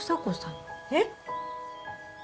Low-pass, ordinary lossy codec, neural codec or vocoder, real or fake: none; none; none; real